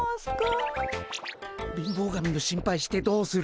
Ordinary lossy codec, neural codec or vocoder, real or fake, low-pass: none; none; real; none